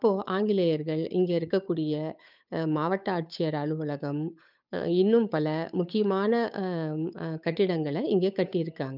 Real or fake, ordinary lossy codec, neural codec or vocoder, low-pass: fake; none; codec, 16 kHz, 8 kbps, FunCodec, trained on Chinese and English, 25 frames a second; 5.4 kHz